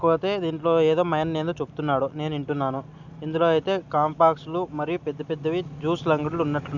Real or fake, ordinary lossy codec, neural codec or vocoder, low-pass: real; none; none; 7.2 kHz